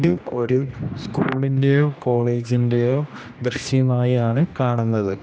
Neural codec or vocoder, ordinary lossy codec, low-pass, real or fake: codec, 16 kHz, 1 kbps, X-Codec, HuBERT features, trained on general audio; none; none; fake